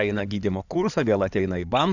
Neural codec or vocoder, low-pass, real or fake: codec, 16 kHz in and 24 kHz out, 2.2 kbps, FireRedTTS-2 codec; 7.2 kHz; fake